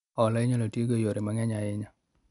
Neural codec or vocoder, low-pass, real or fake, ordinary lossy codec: none; 10.8 kHz; real; none